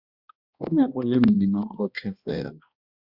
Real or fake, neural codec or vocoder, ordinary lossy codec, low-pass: fake; codec, 16 kHz, 1 kbps, X-Codec, HuBERT features, trained on balanced general audio; Opus, 64 kbps; 5.4 kHz